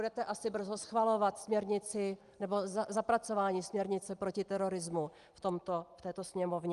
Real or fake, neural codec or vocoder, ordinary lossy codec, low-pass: real; none; Opus, 32 kbps; 10.8 kHz